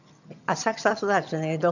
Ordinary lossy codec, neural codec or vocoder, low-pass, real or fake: none; vocoder, 22.05 kHz, 80 mel bands, HiFi-GAN; 7.2 kHz; fake